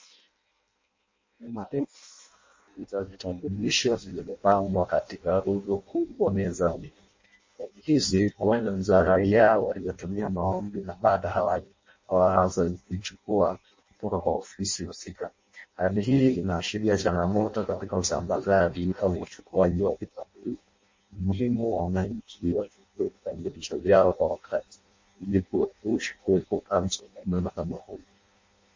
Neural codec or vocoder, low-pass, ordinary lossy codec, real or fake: codec, 16 kHz in and 24 kHz out, 0.6 kbps, FireRedTTS-2 codec; 7.2 kHz; MP3, 32 kbps; fake